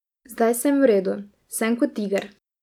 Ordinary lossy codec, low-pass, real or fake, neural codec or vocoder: none; 19.8 kHz; real; none